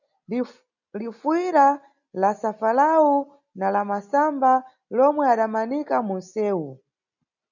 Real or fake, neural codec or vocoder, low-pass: real; none; 7.2 kHz